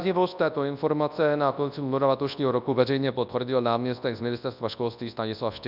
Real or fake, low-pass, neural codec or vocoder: fake; 5.4 kHz; codec, 24 kHz, 0.9 kbps, WavTokenizer, large speech release